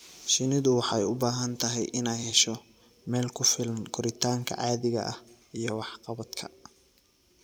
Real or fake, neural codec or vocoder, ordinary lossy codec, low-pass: fake; vocoder, 44.1 kHz, 128 mel bands every 256 samples, BigVGAN v2; none; none